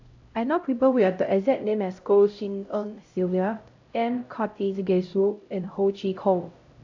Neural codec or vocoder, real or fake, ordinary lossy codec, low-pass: codec, 16 kHz, 0.5 kbps, X-Codec, HuBERT features, trained on LibriSpeech; fake; AAC, 48 kbps; 7.2 kHz